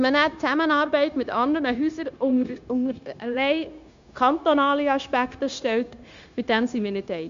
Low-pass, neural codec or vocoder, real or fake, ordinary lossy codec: 7.2 kHz; codec, 16 kHz, 0.9 kbps, LongCat-Audio-Codec; fake; MP3, 64 kbps